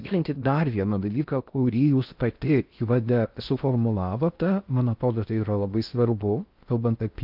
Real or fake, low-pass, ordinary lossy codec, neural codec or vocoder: fake; 5.4 kHz; Opus, 32 kbps; codec, 16 kHz in and 24 kHz out, 0.6 kbps, FocalCodec, streaming, 2048 codes